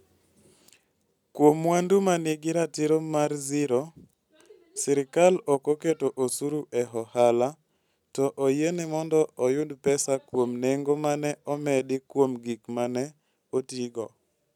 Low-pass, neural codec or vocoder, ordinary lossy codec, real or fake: 19.8 kHz; none; none; real